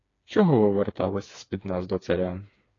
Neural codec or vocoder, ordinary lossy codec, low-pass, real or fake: codec, 16 kHz, 4 kbps, FreqCodec, smaller model; AAC, 32 kbps; 7.2 kHz; fake